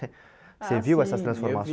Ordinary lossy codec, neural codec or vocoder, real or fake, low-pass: none; none; real; none